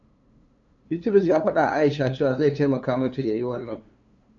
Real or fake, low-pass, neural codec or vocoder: fake; 7.2 kHz; codec, 16 kHz, 2 kbps, FunCodec, trained on LibriTTS, 25 frames a second